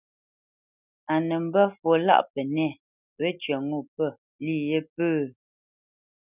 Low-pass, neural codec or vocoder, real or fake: 3.6 kHz; none; real